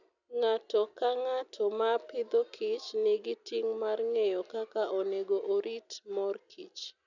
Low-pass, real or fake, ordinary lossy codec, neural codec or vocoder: 7.2 kHz; real; none; none